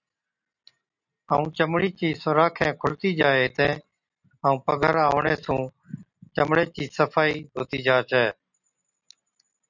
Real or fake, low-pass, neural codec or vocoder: real; 7.2 kHz; none